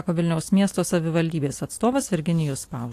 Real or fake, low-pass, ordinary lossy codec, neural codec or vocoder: real; 14.4 kHz; AAC, 64 kbps; none